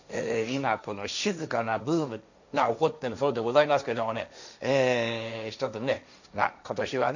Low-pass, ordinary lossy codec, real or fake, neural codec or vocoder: 7.2 kHz; none; fake; codec, 16 kHz, 1.1 kbps, Voila-Tokenizer